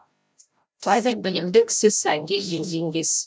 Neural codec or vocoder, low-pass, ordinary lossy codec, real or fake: codec, 16 kHz, 0.5 kbps, FreqCodec, larger model; none; none; fake